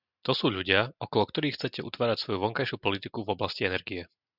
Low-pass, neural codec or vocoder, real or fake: 5.4 kHz; none; real